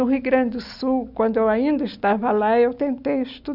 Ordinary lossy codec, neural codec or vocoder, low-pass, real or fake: none; none; 5.4 kHz; real